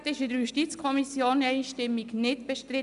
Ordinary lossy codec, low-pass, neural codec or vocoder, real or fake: none; 10.8 kHz; none; real